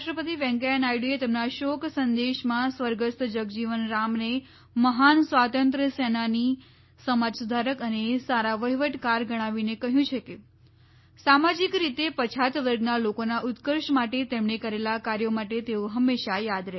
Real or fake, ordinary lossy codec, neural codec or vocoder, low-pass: real; MP3, 24 kbps; none; 7.2 kHz